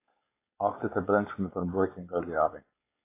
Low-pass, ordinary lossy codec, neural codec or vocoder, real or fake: 3.6 kHz; AAC, 24 kbps; codec, 16 kHz, 4.8 kbps, FACodec; fake